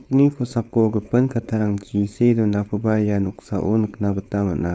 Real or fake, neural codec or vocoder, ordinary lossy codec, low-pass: fake; codec, 16 kHz, 4.8 kbps, FACodec; none; none